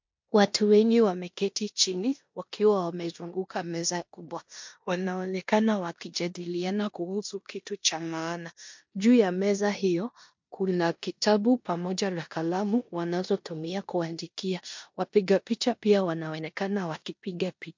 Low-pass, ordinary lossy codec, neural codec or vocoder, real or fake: 7.2 kHz; MP3, 48 kbps; codec, 16 kHz in and 24 kHz out, 0.9 kbps, LongCat-Audio-Codec, four codebook decoder; fake